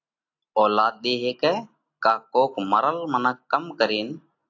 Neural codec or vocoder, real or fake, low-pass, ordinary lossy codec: none; real; 7.2 kHz; AAC, 48 kbps